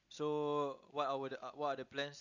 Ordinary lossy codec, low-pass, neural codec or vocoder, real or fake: none; 7.2 kHz; none; real